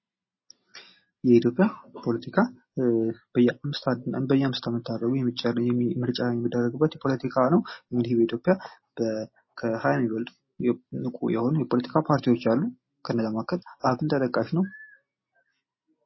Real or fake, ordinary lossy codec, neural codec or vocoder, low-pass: real; MP3, 24 kbps; none; 7.2 kHz